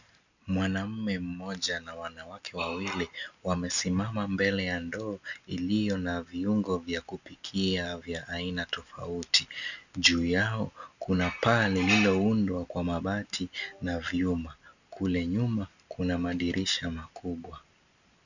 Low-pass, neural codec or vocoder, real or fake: 7.2 kHz; none; real